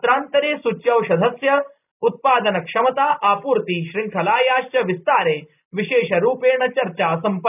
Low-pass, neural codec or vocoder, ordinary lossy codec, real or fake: 3.6 kHz; none; none; real